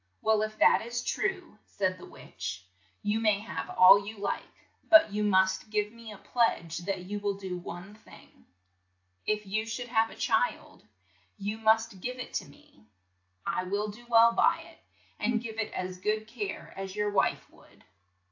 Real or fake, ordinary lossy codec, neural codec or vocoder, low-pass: real; AAC, 48 kbps; none; 7.2 kHz